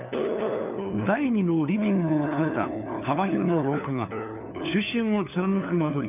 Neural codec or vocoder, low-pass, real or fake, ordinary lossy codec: codec, 16 kHz, 4 kbps, FunCodec, trained on LibriTTS, 50 frames a second; 3.6 kHz; fake; none